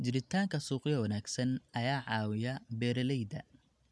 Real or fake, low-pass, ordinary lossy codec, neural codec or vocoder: real; none; none; none